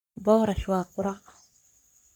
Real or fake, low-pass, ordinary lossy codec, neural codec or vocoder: fake; none; none; codec, 44.1 kHz, 3.4 kbps, Pupu-Codec